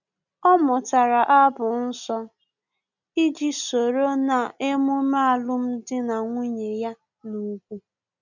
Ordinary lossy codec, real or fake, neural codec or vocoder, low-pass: none; real; none; 7.2 kHz